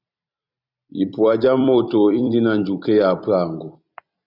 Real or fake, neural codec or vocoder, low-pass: fake; vocoder, 44.1 kHz, 128 mel bands every 256 samples, BigVGAN v2; 5.4 kHz